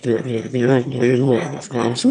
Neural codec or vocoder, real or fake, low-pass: autoencoder, 22.05 kHz, a latent of 192 numbers a frame, VITS, trained on one speaker; fake; 9.9 kHz